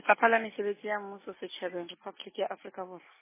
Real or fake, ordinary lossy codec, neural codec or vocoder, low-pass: real; MP3, 16 kbps; none; 3.6 kHz